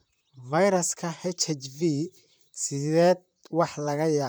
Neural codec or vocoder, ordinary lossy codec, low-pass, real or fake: vocoder, 44.1 kHz, 128 mel bands, Pupu-Vocoder; none; none; fake